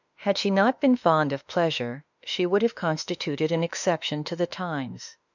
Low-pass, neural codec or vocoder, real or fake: 7.2 kHz; autoencoder, 48 kHz, 32 numbers a frame, DAC-VAE, trained on Japanese speech; fake